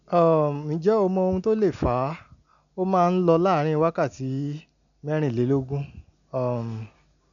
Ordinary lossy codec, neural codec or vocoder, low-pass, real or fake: none; none; 7.2 kHz; real